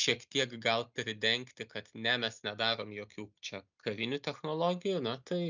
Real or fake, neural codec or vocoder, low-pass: real; none; 7.2 kHz